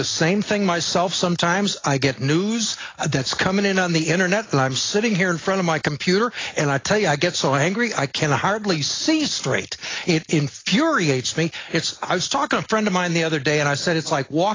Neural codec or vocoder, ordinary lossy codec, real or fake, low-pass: none; AAC, 32 kbps; real; 7.2 kHz